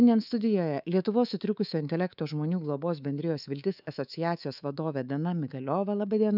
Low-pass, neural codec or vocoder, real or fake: 5.4 kHz; codec, 24 kHz, 3.1 kbps, DualCodec; fake